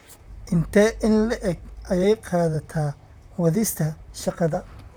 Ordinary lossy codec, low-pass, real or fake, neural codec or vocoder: none; none; fake; vocoder, 44.1 kHz, 128 mel bands, Pupu-Vocoder